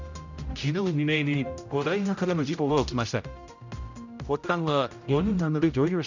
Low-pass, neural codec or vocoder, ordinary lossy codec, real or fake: 7.2 kHz; codec, 16 kHz, 0.5 kbps, X-Codec, HuBERT features, trained on general audio; none; fake